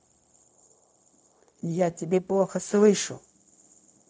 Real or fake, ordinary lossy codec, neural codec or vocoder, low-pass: fake; none; codec, 16 kHz, 0.4 kbps, LongCat-Audio-Codec; none